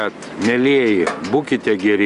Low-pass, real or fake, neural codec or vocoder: 10.8 kHz; real; none